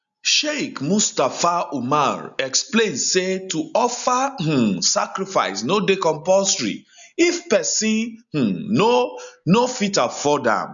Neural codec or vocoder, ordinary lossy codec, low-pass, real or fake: none; none; 7.2 kHz; real